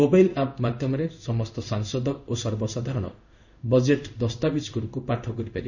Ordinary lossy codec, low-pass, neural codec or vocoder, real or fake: none; 7.2 kHz; codec, 16 kHz in and 24 kHz out, 1 kbps, XY-Tokenizer; fake